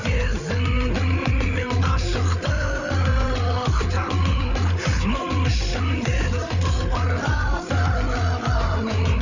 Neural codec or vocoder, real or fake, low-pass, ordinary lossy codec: codec, 16 kHz, 8 kbps, FreqCodec, larger model; fake; 7.2 kHz; none